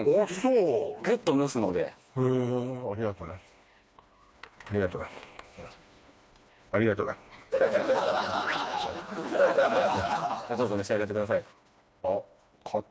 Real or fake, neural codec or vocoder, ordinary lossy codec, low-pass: fake; codec, 16 kHz, 2 kbps, FreqCodec, smaller model; none; none